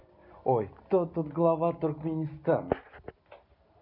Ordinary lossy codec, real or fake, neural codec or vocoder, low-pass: none; real; none; 5.4 kHz